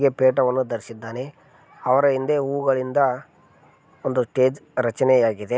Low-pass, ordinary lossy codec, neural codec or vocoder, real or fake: none; none; none; real